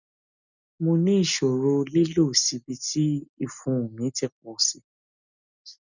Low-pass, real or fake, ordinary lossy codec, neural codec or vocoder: 7.2 kHz; real; none; none